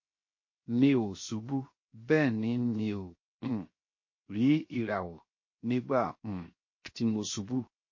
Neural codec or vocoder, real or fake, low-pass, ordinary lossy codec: codec, 16 kHz, 0.7 kbps, FocalCodec; fake; 7.2 kHz; MP3, 32 kbps